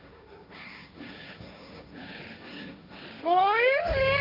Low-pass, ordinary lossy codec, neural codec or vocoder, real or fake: 5.4 kHz; none; codec, 16 kHz, 1.1 kbps, Voila-Tokenizer; fake